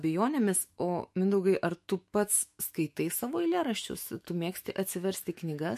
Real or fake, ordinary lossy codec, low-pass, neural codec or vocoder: fake; MP3, 64 kbps; 14.4 kHz; autoencoder, 48 kHz, 128 numbers a frame, DAC-VAE, trained on Japanese speech